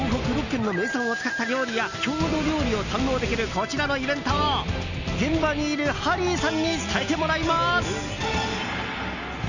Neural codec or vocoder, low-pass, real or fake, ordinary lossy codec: none; 7.2 kHz; real; none